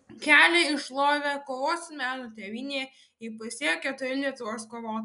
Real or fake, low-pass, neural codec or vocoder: real; 10.8 kHz; none